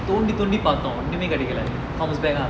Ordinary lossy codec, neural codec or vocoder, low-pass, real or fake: none; none; none; real